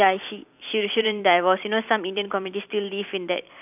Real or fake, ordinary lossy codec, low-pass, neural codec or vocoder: fake; none; 3.6 kHz; vocoder, 44.1 kHz, 128 mel bands every 256 samples, BigVGAN v2